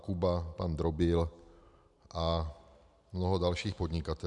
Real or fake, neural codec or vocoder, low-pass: real; none; 10.8 kHz